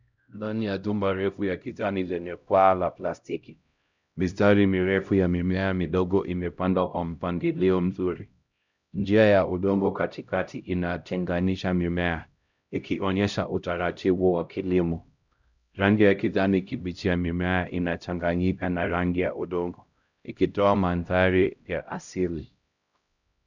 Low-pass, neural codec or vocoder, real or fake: 7.2 kHz; codec, 16 kHz, 0.5 kbps, X-Codec, HuBERT features, trained on LibriSpeech; fake